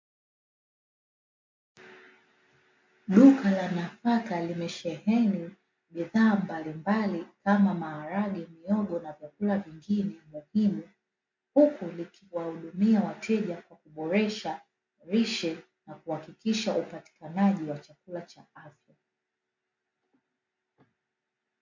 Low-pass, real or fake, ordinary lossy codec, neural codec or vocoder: 7.2 kHz; real; MP3, 48 kbps; none